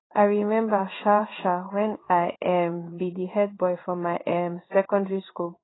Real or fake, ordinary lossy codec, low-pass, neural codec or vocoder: fake; AAC, 16 kbps; 7.2 kHz; codec, 16 kHz in and 24 kHz out, 1 kbps, XY-Tokenizer